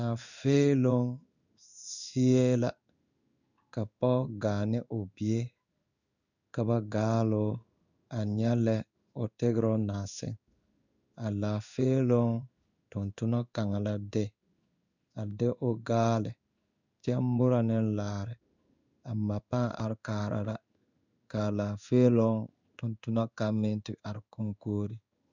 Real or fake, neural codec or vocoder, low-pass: fake; codec, 16 kHz in and 24 kHz out, 1 kbps, XY-Tokenizer; 7.2 kHz